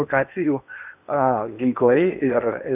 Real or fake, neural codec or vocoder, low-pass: fake; codec, 16 kHz in and 24 kHz out, 0.8 kbps, FocalCodec, streaming, 65536 codes; 3.6 kHz